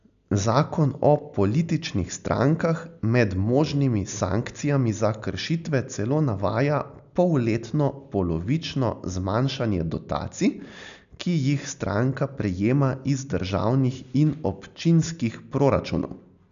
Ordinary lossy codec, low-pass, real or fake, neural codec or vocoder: none; 7.2 kHz; real; none